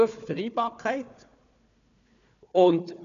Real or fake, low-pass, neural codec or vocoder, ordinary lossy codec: fake; 7.2 kHz; codec, 16 kHz, 4 kbps, FunCodec, trained on Chinese and English, 50 frames a second; none